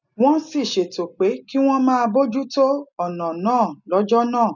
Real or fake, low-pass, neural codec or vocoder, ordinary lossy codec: real; 7.2 kHz; none; none